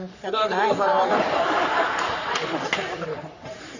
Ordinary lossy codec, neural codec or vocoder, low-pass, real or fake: none; codec, 44.1 kHz, 3.4 kbps, Pupu-Codec; 7.2 kHz; fake